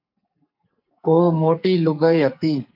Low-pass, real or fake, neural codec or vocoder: 5.4 kHz; fake; codec, 44.1 kHz, 2.6 kbps, SNAC